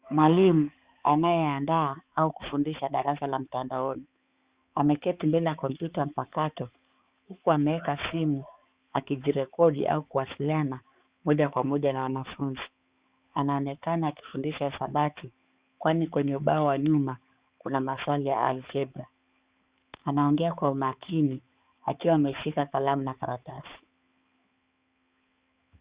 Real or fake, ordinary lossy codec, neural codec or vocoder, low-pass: fake; Opus, 64 kbps; codec, 16 kHz, 4 kbps, X-Codec, HuBERT features, trained on balanced general audio; 3.6 kHz